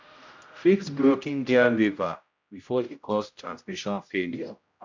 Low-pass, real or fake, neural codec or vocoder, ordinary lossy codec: 7.2 kHz; fake; codec, 16 kHz, 0.5 kbps, X-Codec, HuBERT features, trained on general audio; MP3, 64 kbps